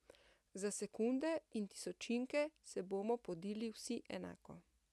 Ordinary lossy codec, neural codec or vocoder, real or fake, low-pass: none; none; real; none